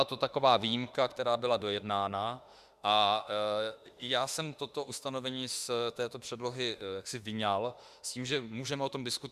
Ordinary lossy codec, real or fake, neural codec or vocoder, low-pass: Opus, 64 kbps; fake; autoencoder, 48 kHz, 32 numbers a frame, DAC-VAE, trained on Japanese speech; 14.4 kHz